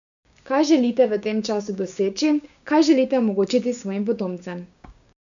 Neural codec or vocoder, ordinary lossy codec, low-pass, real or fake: codec, 16 kHz, 6 kbps, DAC; none; 7.2 kHz; fake